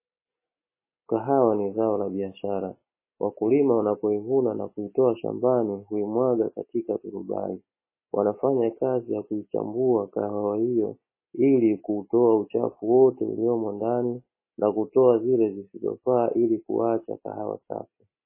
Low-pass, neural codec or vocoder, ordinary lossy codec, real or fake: 3.6 kHz; none; MP3, 16 kbps; real